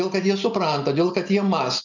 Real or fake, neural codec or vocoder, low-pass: real; none; 7.2 kHz